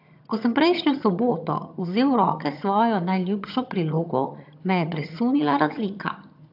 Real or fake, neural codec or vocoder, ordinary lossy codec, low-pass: fake; vocoder, 22.05 kHz, 80 mel bands, HiFi-GAN; AAC, 48 kbps; 5.4 kHz